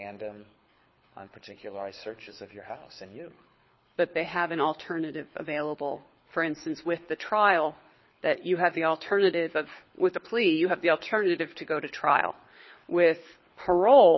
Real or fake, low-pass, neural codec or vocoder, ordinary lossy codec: fake; 7.2 kHz; codec, 24 kHz, 6 kbps, HILCodec; MP3, 24 kbps